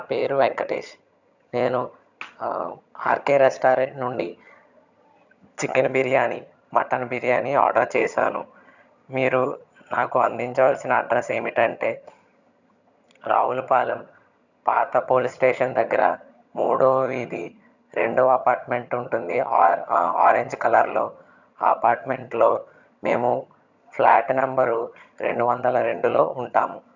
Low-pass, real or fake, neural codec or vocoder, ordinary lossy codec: 7.2 kHz; fake; vocoder, 22.05 kHz, 80 mel bands, HiFi-GAN; none